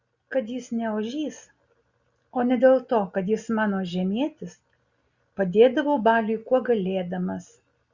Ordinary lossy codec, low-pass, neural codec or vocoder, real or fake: Opus, 64 kbps; 7.2 kHz; none; real